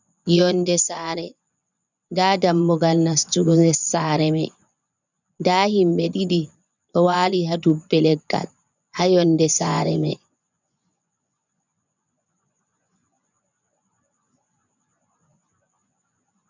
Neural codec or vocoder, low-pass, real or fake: vocoder, 24 kHz, 100 mel bands, Vocos; 7.2 kHz; fake